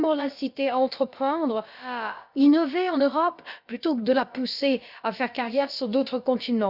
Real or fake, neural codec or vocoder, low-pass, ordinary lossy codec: fake; codec, 16 kHz, about 1 kbps, DyCAST, with the encoder's durations; 5.4 kHz; Opus, 64 kbps